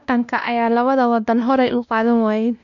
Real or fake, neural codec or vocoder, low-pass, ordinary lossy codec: fake; codec, 16 kHz, 1 kbps, X-Codec, WavLM features, trained on Multilingual LibriSpeech; 7.2 kHz; none